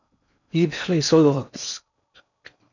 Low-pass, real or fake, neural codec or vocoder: 7.2 kHz; fake; codec, 16 kHz in and 24 kHz out, 0.6 kbps, FocalCodec, streaming, 4096 codes